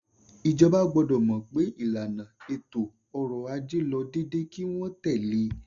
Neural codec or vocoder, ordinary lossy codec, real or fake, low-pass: none; none; real; 7.2 kHz